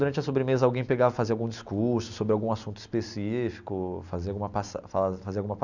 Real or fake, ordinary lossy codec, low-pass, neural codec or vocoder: real; none; 7.2 kHz; none